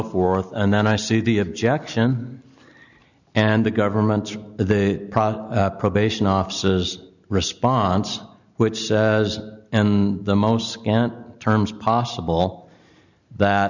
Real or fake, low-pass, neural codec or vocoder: real; 7.2 kHz; none